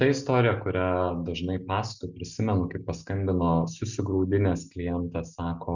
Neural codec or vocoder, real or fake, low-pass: none; real; 7.2 kHz